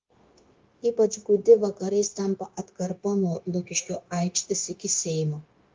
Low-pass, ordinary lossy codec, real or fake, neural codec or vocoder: 7.2 kHz; Opus, 32 kbps; fake; codec, 16 kHz, 0.9 kbps, LongCat-Audio-Codec